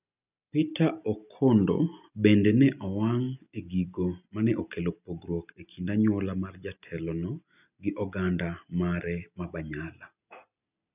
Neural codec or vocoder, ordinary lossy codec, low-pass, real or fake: none; none; 3.6 kHz; real